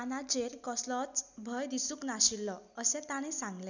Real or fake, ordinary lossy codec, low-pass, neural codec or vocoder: real; none; 7.2 kHz; none